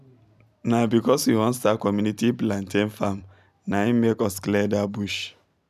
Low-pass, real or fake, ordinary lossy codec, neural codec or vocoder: 14.4 kHz; real; none; none